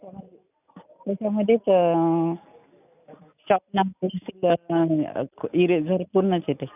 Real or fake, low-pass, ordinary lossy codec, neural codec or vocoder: real; 3.6 kHz; none; none